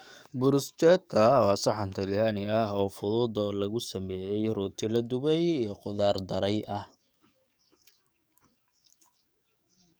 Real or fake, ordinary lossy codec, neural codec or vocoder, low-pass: fake; none; codec, 44.1 kHz, 7.8 kbps, DAC; none